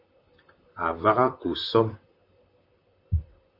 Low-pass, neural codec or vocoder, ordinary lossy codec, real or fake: 5.4 kHz; vocoder, 44.1 kHz, 128 mel bands, Pupu-Vocoder; Opus, 64 kbps; fake